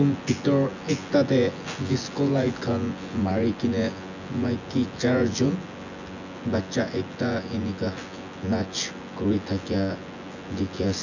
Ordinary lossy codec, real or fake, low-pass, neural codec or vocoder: none; fake; 7.2 kHz; vocoder, 24 kHz, 100 mel bands, Vocos